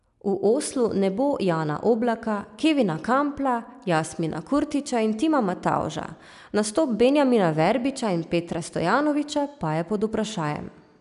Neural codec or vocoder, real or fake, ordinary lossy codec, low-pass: none; real; none; 10.8 kHz